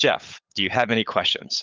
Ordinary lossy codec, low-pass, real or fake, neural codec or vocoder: Opus, 24 kbps; 7.2 kHz; fake; codec, 16 kHz, 8 kbps, FunCodec, trained on LibriTTS, 25 frames a second